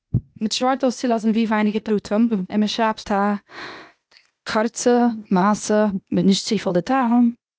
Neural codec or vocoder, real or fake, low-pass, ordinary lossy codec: codec, 16 kHz, 0.8 kbps, ZipCodec; fake; none; none